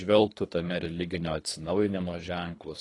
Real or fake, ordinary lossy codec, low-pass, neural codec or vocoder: fake; AAC, 32 kbps; 10.8 kHz; codec, 24 kHz, 3 kbps, HILCodec